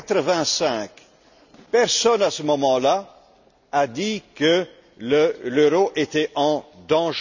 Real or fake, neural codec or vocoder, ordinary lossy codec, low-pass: real; none; none; 7.2 kHz